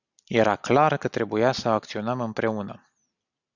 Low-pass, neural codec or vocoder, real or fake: 7.2 kHz; none; real